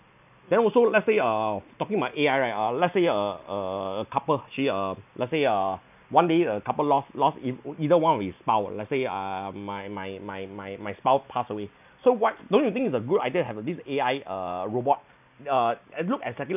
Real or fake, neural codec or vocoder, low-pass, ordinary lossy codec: real; none; 3.6 kHz; none